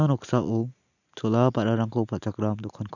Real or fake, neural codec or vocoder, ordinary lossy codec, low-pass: real; none; none; 7.2 kHz